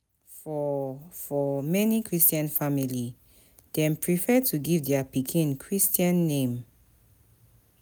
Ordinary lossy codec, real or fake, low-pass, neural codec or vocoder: none; real; none; none